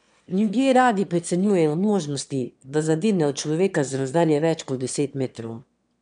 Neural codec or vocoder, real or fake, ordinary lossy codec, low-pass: autoencoder, 22.05 kHz, a latent of 192 numbers a frame, VITS, trained on one speaker; fake; MP3, 96 kbps; 9.9 kHz